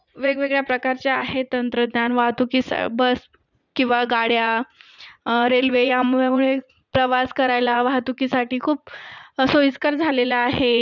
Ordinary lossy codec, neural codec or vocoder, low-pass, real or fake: none; vocoder, 44.1 kHz, 80 mel bands, Vocos; 7.2 kHz; fake